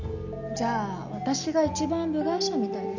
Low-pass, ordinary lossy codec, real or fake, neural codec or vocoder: 7.2 kHz; none; real; none